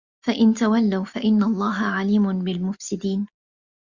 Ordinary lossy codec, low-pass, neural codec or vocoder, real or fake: Opus, 64 kbps; 7.2 kHz; none; real